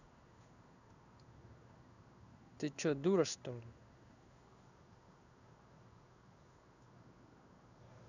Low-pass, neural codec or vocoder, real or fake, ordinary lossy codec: 7.2 kHz; codec, 16 kHz in and 24 kHz out, 1 kbps, XY-Tokenizer; fake; none